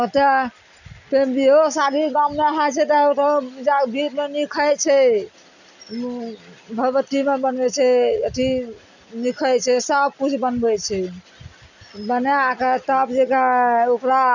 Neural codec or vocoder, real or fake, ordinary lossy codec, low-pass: none; real; none; 7.2 kHz